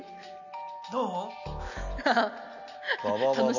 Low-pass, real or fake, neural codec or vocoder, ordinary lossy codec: 7.2 kHz; real; none; none